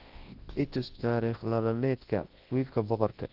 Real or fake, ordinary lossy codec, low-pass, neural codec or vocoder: fake; Opus, 16 kbps; 5.4 kHz; codec, 24 kHz, 0.9 kbps, WavTokenizer, large speech release